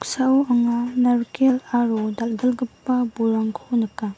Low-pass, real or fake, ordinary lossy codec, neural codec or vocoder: none; real; none; none